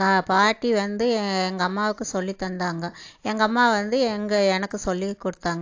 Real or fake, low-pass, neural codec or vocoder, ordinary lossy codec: real; 7.2 kHz; none; AAC, 48 kbps